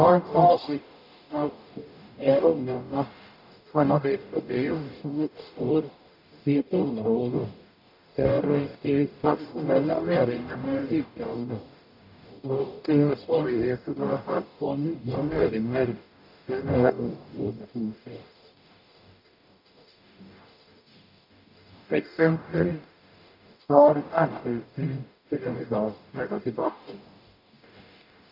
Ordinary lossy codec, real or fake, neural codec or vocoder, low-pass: none; fake; codec, 44.1 kHz, 0.9 kbps, DAC; 5.4 kHz